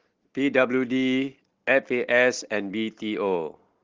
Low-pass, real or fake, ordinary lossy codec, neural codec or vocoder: 7.2 kHz; real; Opus, 16 kbps; none